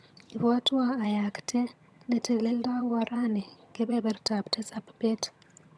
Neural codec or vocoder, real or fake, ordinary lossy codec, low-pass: vocoder, 22.05 kHz, 80 mel bands, HiFi-GAN; fake; none; none